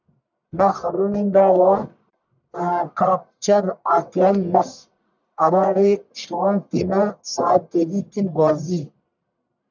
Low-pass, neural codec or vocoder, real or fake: 7.2 kHz; codec, 44.1 kHz, 1.7 kbps, Pupu-Codec; fake